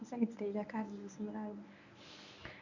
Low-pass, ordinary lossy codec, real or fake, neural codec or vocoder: 7.2 kHz; none; fake; codec, 24 kHz, 0.9 kbps, WavTokenizer, medium speech release version 1